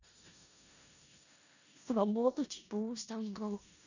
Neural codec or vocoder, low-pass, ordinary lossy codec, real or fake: codec, 16 kHz in and 24 kHz out, 0.4 kbps, LongCat-Audio-Codec, four codebook decoder; 7.2 kHz; none; fake